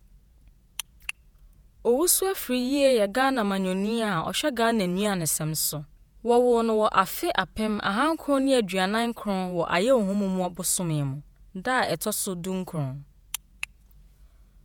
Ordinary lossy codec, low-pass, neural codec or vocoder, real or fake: none; none; vocoder, 48 kHz, 128 mel bands, Vocos; fake